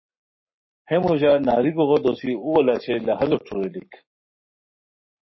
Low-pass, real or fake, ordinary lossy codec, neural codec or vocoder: 7.2 kHz; fake; MP3, 24 kbps; codec, 44.1 kHz, 7.8 kbps, Pupu-Codec